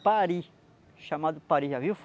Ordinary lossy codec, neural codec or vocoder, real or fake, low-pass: none; none; real; none